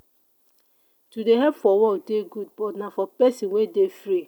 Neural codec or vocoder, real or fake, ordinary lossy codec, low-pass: none; real; none; 19.8 kHz